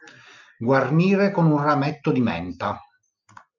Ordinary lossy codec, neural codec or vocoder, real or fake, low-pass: MP3, 64 kbps; none; real; 7.2 kHz